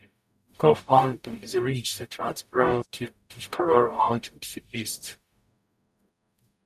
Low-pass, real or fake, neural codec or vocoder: 14.4 kHz; fake; codec, 44.1 kHz, 0.9 kbps, DAC